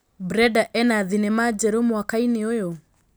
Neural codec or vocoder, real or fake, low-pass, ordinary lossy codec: none; real; none; none